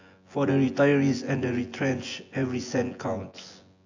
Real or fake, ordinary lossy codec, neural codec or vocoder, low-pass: fake; AAC, 48 kbps; vocoder, 24 kHz, 100 mel bands, Vocos; 7.2 kHz